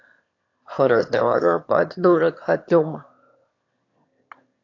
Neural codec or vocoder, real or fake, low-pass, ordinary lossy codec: autoencoder, 22.05 kHz, a latent of 192 numbers a frame, VITS, trained on one speaker; fake; 7.2 kHz; AAC, 48 kbps